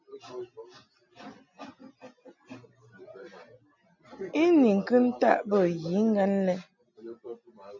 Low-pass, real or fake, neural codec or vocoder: 7.2 kHz; real; none